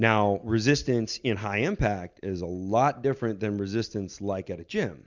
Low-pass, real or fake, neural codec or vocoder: 7.2 kHz; real; none